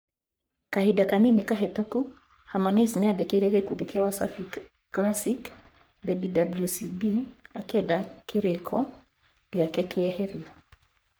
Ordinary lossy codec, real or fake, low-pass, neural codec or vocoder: none; fake; none; codec, 44.1 kHz, 3.4 kbps, Pupu-Codec